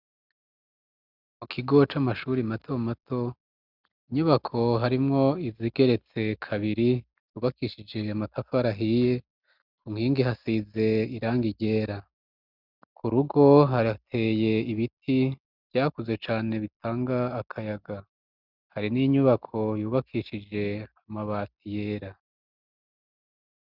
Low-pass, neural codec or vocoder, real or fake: 5.4 kHz; none; real